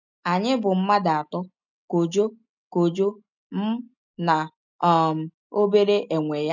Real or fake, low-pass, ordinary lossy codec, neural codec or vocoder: real; 7.2 kHz; none; none